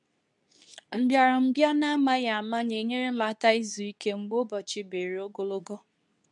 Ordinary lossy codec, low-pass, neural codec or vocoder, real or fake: none; 10.8 kHz; codec, 24 kHz, 0.9 kbps, WavTokenizer, medium speech release version 2; fake